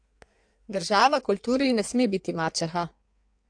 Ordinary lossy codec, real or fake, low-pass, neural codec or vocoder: AAC, 64 kbps; fake; 9.9 kHz; codec, 16 kHz in and 24 kHz out, 1.1 kbps, FireRedTTS-2 codec